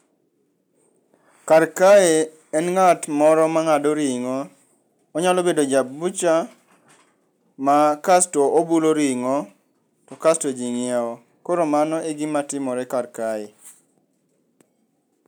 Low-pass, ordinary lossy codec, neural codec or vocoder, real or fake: none; none; none; real